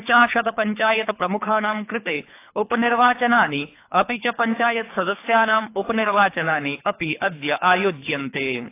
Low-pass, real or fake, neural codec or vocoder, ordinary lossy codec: 3.6 kHz; fake; codec, 24 kHz, 3 kbps, HILCodec; AAC, 24 kbps